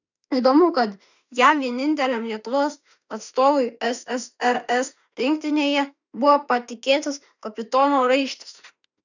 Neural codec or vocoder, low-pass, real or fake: autoencoder, 48 kHz, 32 numbers a frame, DAC-VAE, trained on Japanese speech; 7.2 kHz; fake